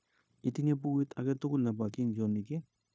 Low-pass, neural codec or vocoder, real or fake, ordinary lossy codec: none; codec, 16 kHz, 0.9 kbps, LongCat-Audio-Codec; fake; none